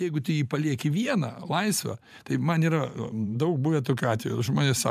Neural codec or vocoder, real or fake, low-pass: vocoder, 44.1 kHz, 128 mel bands every 512 samples, BigVGAN v2; fake; 14.4 kHz